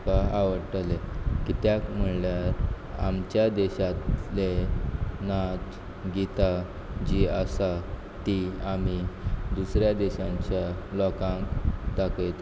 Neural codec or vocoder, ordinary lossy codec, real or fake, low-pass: none; none; real; none